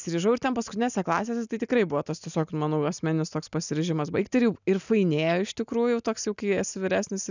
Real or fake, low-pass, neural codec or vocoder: real; 7.2 kHz; none